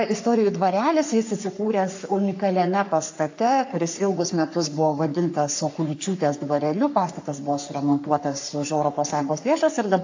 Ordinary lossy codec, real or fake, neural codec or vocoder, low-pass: AAC, 48 kbps; fake; codec, 44.1 kHz, 3.4 kbps, Pupu-Codec; 7.2 kHz